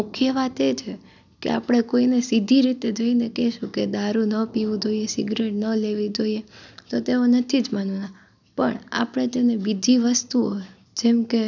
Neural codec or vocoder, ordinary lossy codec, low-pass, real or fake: none; none; 7.2 kHz; real